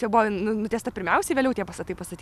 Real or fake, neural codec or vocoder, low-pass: real; none; 14.4 kHz